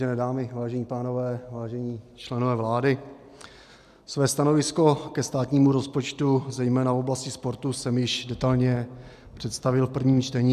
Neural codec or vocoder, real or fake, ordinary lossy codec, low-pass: none; real; AAC, 96 kbps; 14.4 kHz